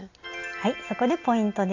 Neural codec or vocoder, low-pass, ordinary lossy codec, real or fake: none; 7.2 kHz; AAC, 48 kbps; real